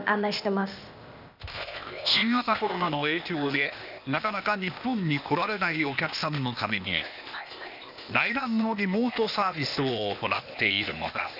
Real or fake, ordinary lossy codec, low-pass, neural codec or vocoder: fake; none; 5.4 kHz; codec, 16 kHz, 0.8 kbps, ZipCodec